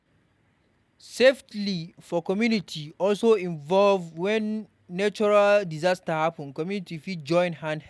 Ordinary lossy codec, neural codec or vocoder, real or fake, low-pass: none; none; real; none